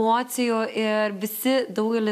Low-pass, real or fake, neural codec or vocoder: 14.4 kHz; real; none